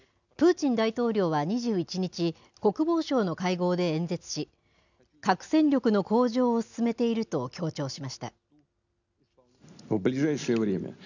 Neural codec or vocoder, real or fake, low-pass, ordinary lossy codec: none; real; 7.2 kHz; none